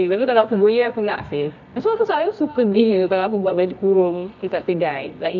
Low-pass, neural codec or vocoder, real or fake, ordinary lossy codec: 7.2 kHz; codec, 24 kHz, 0.9 kbps, WavTokenizer, medium music audio release; fake; none